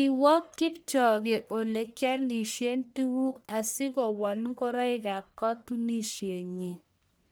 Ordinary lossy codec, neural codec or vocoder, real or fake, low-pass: none; codec, 44.1 kHz, 1.7 kbps, Pupu-Codec; fake; none